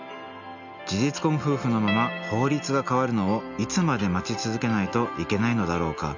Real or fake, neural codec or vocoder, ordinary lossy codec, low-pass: real; none; none; 7.2 kHz